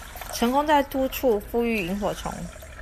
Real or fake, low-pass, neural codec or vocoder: real; 14.4 kHz; none